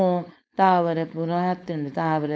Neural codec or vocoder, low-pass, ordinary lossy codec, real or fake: codec, 16 kHz, 4.8 kbps, FACodec; none; none; fake